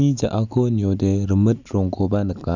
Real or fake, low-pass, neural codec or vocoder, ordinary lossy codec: real; 7.2 kHz; none; none